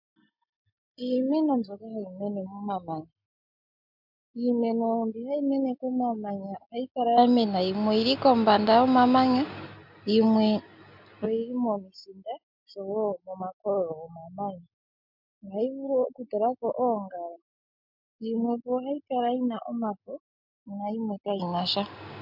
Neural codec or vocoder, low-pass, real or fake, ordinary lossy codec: none; 5.4 kHz; real; MP3, 48 kbps